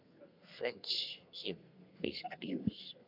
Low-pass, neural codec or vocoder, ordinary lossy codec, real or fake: 5.4 kHz; codec, 32 kHz, 1.9 kbps, SNAC; MP3, 48 kbps; fake